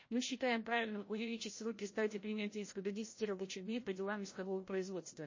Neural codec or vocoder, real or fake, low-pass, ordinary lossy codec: codec, 16 kHz, 0.5 kbps, FreqCodec, larger model; fake; 7.2 kHz; MP3, 32 kbps